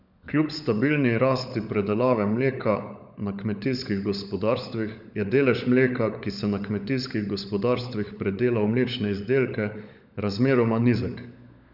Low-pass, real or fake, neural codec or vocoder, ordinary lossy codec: 5.4 kHz; fake; codec, 16 kHz, 16 kbps, FunCodec, trained on LibriTTS, 50 frames a second; none